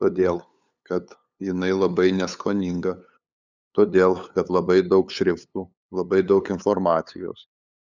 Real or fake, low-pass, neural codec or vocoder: fake; 7.2 kHz; codec, 16 kHz, 8 kbps, FunCodec, trained on LibriTTS, 25 frames a second